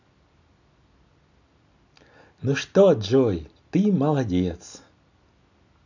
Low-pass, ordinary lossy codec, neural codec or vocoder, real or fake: 7.2 kHz; none; none; real